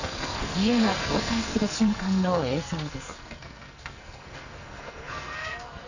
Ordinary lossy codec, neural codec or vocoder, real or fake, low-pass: none; codec, 44.1 kHz, 2.6 kbps, SNAC; fake; 7.2 kHz